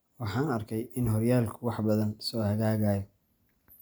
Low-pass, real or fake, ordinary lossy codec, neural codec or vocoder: none; real; none; none